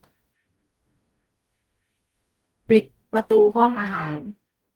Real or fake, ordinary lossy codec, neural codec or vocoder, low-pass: fake; Opus, 24 kbps; codec, 44.1 kHz, 0.9 kbps, DAC; 19.8 kHz